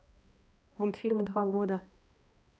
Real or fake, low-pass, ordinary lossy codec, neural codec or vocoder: fake; none; none; codec, 16 kHz, 1 kbps, X-Codec, HuBERT features, trained on balanced general audio